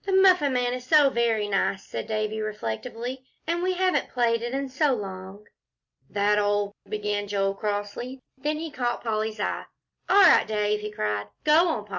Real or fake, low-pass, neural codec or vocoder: real; 7.2 kHz; none